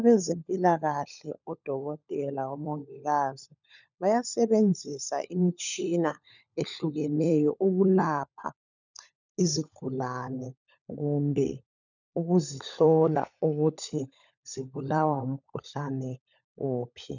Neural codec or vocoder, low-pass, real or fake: codec, 16 kHz, 16 kbps, FunCodec, trained on LibriTTS, 50 frames a second; 7.2 kHz; fake